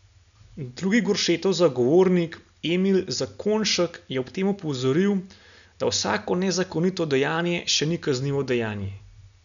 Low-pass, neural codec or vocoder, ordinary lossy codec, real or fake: 7.2 kHz; none; none; real